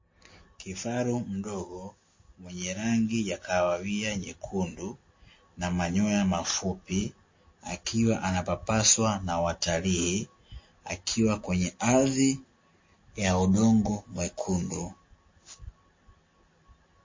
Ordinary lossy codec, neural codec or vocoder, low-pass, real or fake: MP3, 32 kbps; none; 7.2 kHz; real